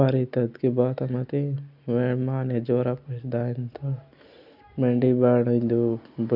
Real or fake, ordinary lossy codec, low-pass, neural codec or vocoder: real; none; 5.4 kHz; none